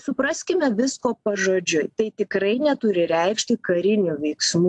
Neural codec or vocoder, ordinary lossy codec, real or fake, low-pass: none; AAC, 64 kbps; real; 10.8 kHz